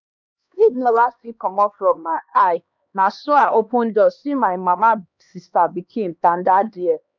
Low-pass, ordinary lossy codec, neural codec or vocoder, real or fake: 7.2 kHz; none; codec, 16 kHz, 2 kbps, X-Codec, HuBERT features, trained on LibriSpeech; fake